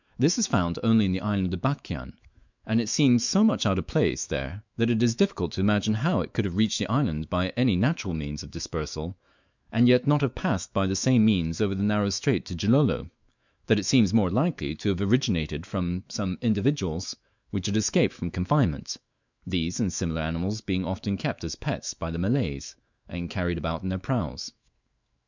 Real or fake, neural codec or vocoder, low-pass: fake; autoencoder, 48 kHz, 128 numbers a frame, DAC-VAE, trained on Japanese speech; 7.2 kHz